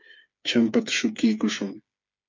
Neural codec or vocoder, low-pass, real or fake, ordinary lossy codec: codec, 16 kHz, 4 kbps, FreqCodec, smaller model; 7.2 kHz; fake; MP3, 64 kbps